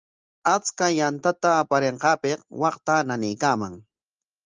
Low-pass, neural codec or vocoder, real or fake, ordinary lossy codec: 7.2 kHz; none; real; Opus, 24 kbps